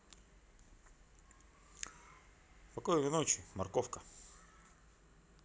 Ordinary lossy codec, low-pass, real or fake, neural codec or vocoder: none; none; real; none